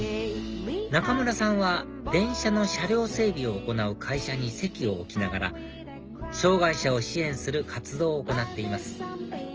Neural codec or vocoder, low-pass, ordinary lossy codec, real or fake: none; 7.2 kHz; Opus, 24 kbps; real